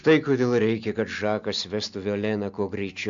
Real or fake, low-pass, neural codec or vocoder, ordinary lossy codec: real; 7.2 kHz; none; MP3, 64 kbps